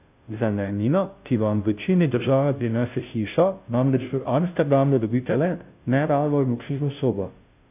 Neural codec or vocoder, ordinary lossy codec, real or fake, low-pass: codec, 16 kHz, 0.5 kbps, FunCodec, trained on Chinese and English, 25 frames a second; AAC, 32 kbps; fake; 3.6 kHz